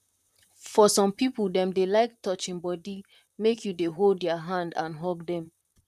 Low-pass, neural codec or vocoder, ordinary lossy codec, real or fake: 14.4 kHz; vocoder, 44.1 kHz, 128 mel bands, Pupu-Vocoder; none; fake